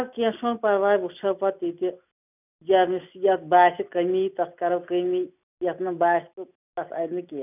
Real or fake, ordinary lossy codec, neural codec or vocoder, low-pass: real; none; none; 3.6 kHz